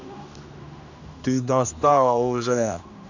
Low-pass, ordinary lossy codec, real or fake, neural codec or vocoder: 7.2 kHz; none; fake; codec, 16 kHz, 1 kbps, X-Codec, HuBERT features, trained on general audio